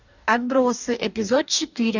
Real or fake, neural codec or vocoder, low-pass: fake; codec, 44.1 kHz, 2.6 kbps, DAC; 7.2 kHz